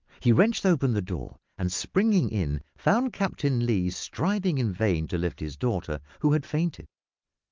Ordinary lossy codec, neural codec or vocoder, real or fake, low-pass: Opus, 24 kbps; none; real; 7.2 kHz